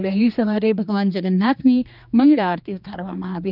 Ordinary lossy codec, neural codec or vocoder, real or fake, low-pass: none; codec, 16 kHz, 2 kbps, X-Codec, HuBERT features, trained on general audio; fake; 5.4 kHz